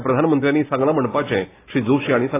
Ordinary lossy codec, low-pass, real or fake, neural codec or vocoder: AAC, 16 kbps; 3.6 kHz; real; none